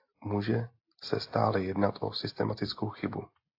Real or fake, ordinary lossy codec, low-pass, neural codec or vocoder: real; AAC, 32 kbps; 5.4 kHz; none